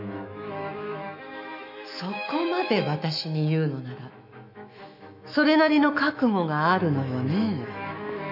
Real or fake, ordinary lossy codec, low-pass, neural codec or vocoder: fake; none; 5.4 kHz; autoencoder, 48 kHz, 128 numbers a frame, DAC-VAE, trained on Japanese speech